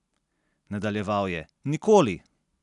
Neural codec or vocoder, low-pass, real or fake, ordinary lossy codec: none; 10.8 kHz; real; none